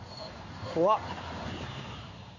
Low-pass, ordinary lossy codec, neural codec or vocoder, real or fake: 7.2 kHz; none; codec, 16 kHz in and 24 kHz out, 1 kbps, XY-Tokenizer; fake